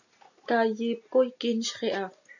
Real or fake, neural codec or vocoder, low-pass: real; none; 7.2 kHz